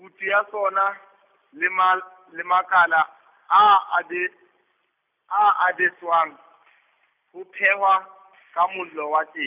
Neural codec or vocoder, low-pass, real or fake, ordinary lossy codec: none; 3.6 kHz; real; none